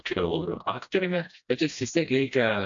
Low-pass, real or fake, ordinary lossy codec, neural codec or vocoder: 7.2 kHz; fake; AAC, 64 kbps; codec, 16 kHz, 1 kbps, FreqCodec, smaller model